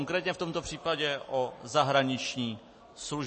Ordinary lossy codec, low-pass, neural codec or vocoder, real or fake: MP3, 32 kbps; 10.8 kHz; none; real